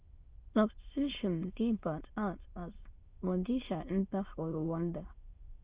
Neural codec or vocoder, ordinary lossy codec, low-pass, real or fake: autoencoder, 22.05 kHz, a latent of 192 numbers a frame, VITS, trained on many speakers; Opus, 32 kbps; 3.6 kHz; fake